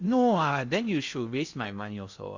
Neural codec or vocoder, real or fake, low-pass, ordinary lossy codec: codec, 16 kHz in and 24 kHz out, 0.6 kbps, FocalCodec, streaming, 2048 codes; fake; 7.2 kHz; Opus, 64 kbps